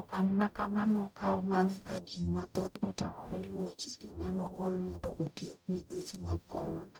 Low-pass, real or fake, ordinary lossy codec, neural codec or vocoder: none; fake; none; codec, 44.1 kHz, 0.9 kbps, DAC